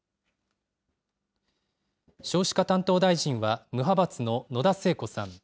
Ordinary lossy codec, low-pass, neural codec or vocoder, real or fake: none; none; none; real